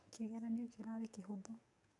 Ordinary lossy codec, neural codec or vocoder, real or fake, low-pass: none; codec, 44.1 kHz, 7.8 kbps, DAC; fake; 10.8 kHz